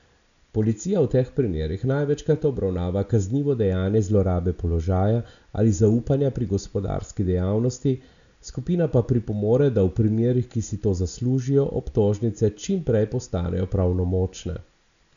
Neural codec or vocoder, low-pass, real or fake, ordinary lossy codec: none; 7.2 kHz; real; none